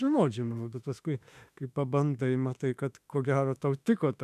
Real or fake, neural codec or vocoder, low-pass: fake; autoencoder, 48 kHz, 32 numbers a frame, DAC-VAE, trained on Japanese speech; 14.4 kHz